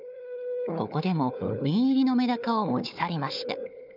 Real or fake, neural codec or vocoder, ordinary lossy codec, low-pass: fake; codec, 16 kHz, 4 kbps, FunCodec, trained on Chinese and English, 50 frames a second; none; 5.4 kHz